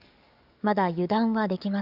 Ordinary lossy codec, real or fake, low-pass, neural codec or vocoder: none; fake; 5.4 kHz; codec, 44.1 kHz, 7.8 kbps, DAC